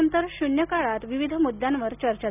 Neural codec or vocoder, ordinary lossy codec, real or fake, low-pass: none; none; real; 3.6 kHz